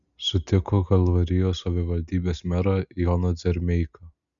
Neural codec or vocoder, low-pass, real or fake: none; 7.2 kHz; real